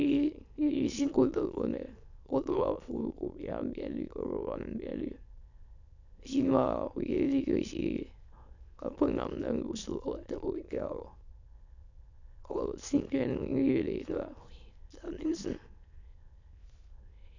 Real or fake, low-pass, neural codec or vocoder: fake; 7.2 kHz; autoencoder, 22.05 kHz, a latent of 192 numbers a frame, VITS, trained on many speakers